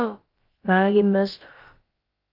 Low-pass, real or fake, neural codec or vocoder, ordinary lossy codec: 5.4 kHz; fake; codec, 16 kHz, about 1 kbps, DyCAST, with the encoder's durations; Opus, 32 kbps